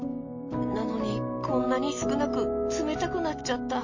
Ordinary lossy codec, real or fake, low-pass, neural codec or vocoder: MP3, 32 kbps; real; 7.2 kHz; none